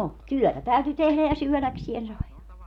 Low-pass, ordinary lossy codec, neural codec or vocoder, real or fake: 19.8 kHz; none; none; real